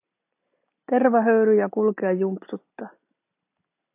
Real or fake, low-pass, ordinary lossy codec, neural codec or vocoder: real; 3.6 kHz; AAC, 32 kbps; none